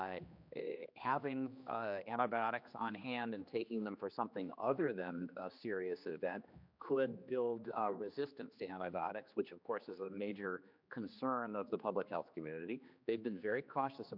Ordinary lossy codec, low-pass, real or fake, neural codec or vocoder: MP3, 48 kbps; 5.4 kHz; fake; codec, 16 kHz, 2 kbps, X-Codec, HuBERT features, trained on general audio